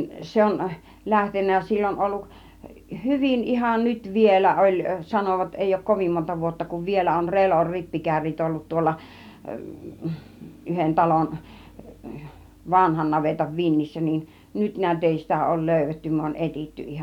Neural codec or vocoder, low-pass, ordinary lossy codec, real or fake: none; 19.8 kHz; none; real